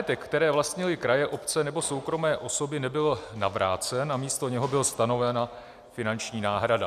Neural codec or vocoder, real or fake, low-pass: none; real; 14.4 kHz